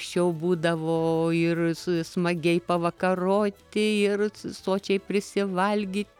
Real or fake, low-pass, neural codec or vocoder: real; 19.8 kHz; none